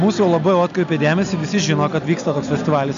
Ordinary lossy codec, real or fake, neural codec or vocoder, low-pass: MP3, 64 kbps; real; none; 7.2 kHz